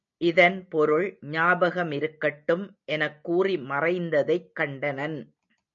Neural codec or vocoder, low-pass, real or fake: none; 7.2 kHz; real